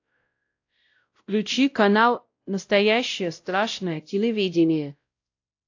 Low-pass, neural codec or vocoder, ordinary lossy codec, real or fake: 7.2 kHz; codec, 16 kHz, 0.5 kbps, X-Codec, WavLM features, trained on Multilingual LibriSpeech; AAC, 48 kbps; fake